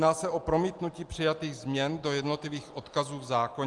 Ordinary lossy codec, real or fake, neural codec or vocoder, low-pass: Opus, 24 kbps; real; none; 10.8 kHz